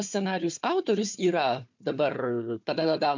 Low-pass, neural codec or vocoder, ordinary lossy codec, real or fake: 7.2 kHz; codec, 16 kHz, 4 kbps, FunCodec, trained on Chinese and English, 50 frames a second; MP3, 64 kbps; fake